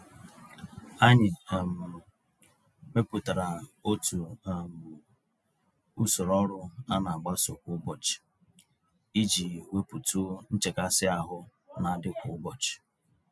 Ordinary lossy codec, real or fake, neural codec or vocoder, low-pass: none; real; none; none